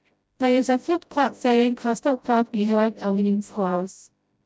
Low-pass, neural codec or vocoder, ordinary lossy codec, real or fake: none; codec, 16 kHz, 0.5 kbps, FreqCodec, smaller model; none; fake